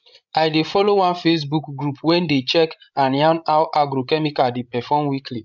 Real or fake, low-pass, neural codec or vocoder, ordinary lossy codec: fake; 7.2 kHz; codec, 16 kHz, 16 kbps, FreqCodec, larger model; none